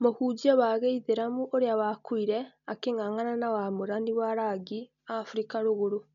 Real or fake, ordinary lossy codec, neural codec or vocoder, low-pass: real; none; none; 7.2 kHz